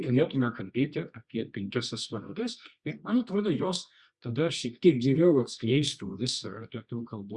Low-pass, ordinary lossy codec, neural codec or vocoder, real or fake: 10.8 kHz; Opus, 64 kbps; codec, 24 kHz, 0.9 kbps, WavTokenizer, medium music audio release; fake